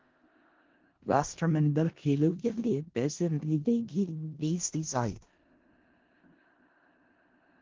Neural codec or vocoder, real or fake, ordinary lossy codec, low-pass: codec, 16 kHz in and 24 kHz out, 0.4 kbps, LongCat-Audio-Codec, four codebook decoder; fake; Opus, 16 kbps; 7.2 kHz